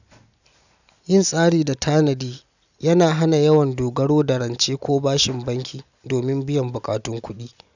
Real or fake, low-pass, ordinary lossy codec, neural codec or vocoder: real; 7.2 kHz; none; none